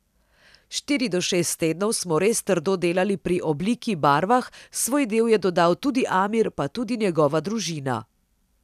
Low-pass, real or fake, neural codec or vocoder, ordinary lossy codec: 14.4 kHz; real; none; none